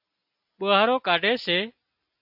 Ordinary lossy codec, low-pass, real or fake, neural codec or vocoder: AAC, 48 kbps; 5.4 kHz; real; none